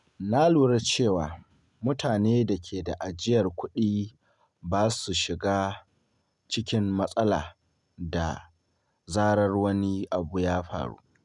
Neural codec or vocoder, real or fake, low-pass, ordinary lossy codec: none; real; 10.8 kHz; none